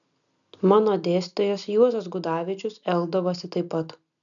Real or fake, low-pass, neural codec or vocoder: real; 7.2 kHz; none